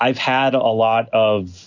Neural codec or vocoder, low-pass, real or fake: none; 7.2 kHz; real